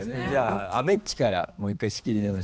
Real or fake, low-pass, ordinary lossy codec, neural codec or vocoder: fake; none; none; codec, 16 kHz, 2 kbps, X-Codec, HuBERT features, trained on balanced general audio